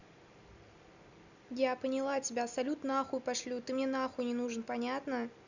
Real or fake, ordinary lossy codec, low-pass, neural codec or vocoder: real; none; 7.2 kHz; none